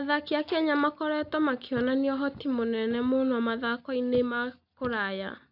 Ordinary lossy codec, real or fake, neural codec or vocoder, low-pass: none; real; none; 5.4 kHz